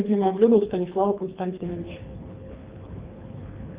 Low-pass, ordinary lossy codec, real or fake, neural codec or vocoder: 3.6 kHz; Opus, 32 kbps; fake; codec, 24 kHz, 3 kbps, HILCodec